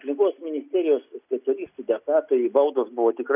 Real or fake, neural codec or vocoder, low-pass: real; none; 3.6 kHz